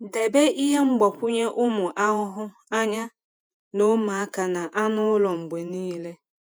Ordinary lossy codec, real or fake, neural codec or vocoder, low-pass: none; fake; vocoder, 48 kHz, 128 mel bands, Vocos; none